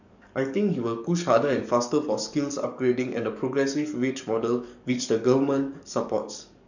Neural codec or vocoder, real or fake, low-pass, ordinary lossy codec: codec, 44.1 kHz, 7.8 kbps, DAC; fake; 7.2 kHz; none